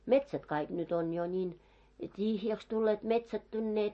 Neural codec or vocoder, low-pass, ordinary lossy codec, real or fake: none; 10.8 kHz; MP3, 32 kbps; real